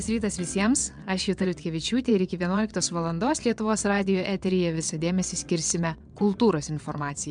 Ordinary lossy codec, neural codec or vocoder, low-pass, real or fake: MP3, 96 kbps; vocoder, 22.05 kHz, 80 mel bands, WaveNeXt; 9.9 kHz; fake